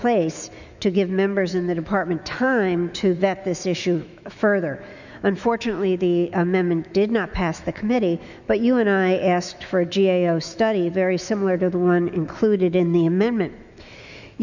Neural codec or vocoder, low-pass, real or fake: autoencoder, 48 kHz, 128 numbers a frame, DAC-VAE, trained on Japanese speech; 7.2 kHz; fake